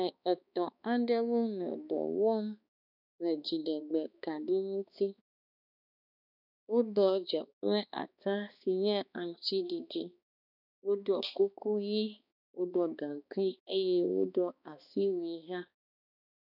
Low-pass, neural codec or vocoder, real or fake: 5.4 kHz; codec, 16 kHz, 2 kbps, X-Codec, HuBERT features, trained on balanced general audio; fake